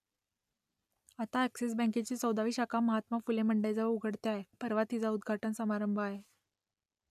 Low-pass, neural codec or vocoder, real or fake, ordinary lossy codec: 14.4 kHz; none; real; none